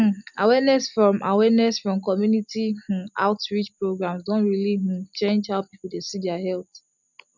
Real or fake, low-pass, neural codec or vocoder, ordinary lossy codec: real; 7.2 kHz; none; none